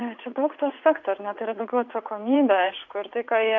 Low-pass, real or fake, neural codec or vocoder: 7.2 kHz; fake; vocoder, 44.1 kHz, 80 mel bands, Vocos